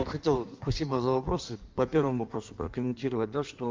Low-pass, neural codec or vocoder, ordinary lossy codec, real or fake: 7.2 kHz; codec, 16 kHz in and 24 kHz out, 1.1 kbps, FireRedTTS-2 codec; Opus, 32 kbps; fake